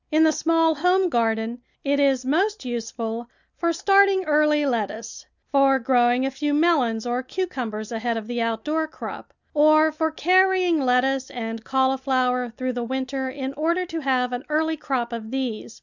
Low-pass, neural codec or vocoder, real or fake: 7.2 kHz; none; real